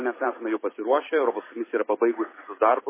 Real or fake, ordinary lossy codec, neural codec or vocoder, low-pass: fake; MP3, 16 kbps; vocoder, 44.1 kHz, 128 mel bands every 256 samples, BigVGAN v2; 3.6 kHz